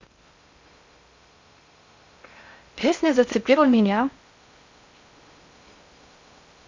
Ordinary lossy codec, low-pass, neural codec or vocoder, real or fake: MP3, 64 kbps; 7.2 kHz; codec, 16 kHz in and 24 kHz out, 0.6 kbps, FocalCodec, streaming, 2048 codes; fake